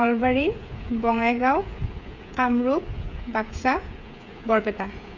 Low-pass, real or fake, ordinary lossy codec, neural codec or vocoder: 7.2 kHz; fake; none; codec, 16 kHz, 8 kbps, FreqCodec, smaller model